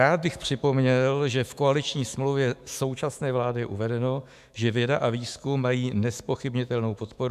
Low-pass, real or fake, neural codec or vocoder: 14.4 kHz; fake; autoencoder, 48 kHz, 128 numbers a frame, DAC-VAE, trained on Japanese speech